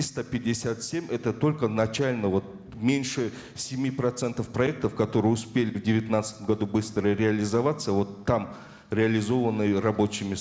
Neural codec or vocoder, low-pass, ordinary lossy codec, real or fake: none; none; none; real